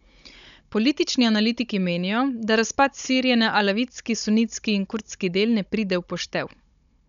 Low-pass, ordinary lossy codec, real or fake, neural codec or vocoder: 7.2 kHz; none; fake; codec, 16 kHz, 16 kbps, FunCodec, trained on Chinese and English, 50 frames a second